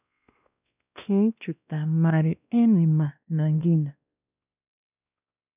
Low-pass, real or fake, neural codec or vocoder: 3.6 kHz; fake; codec, 16 kHz, 1 kbps, X-Codec, WavLM features, trained on Multilingual LibriSpeech